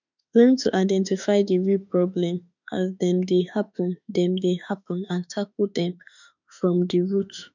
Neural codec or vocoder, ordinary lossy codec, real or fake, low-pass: autoencoder, 48 kHz, 32 numbers a frame, DAC-VAE, trained on Japanese speech; none; fake; 7.2 kHz